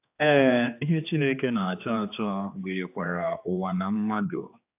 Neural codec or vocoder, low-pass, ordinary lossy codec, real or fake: codec, 16 kHz, 2 kbps, X-Codec, HuBERT features, trained on general audio; 3.6 kHz; AAC, 32 kbps; fake